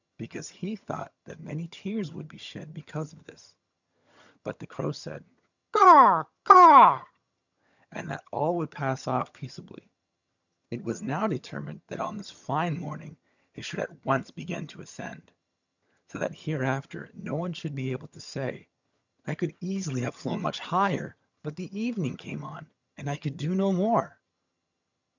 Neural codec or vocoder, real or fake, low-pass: vocoder, 22.05 kHz, 80 mel bands, HiFi-GAN; fake; 7.2 kHz